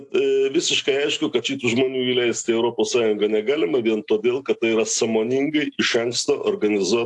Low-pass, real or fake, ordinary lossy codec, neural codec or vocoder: 10.8 kHz; real; AAC, 64 kbps; none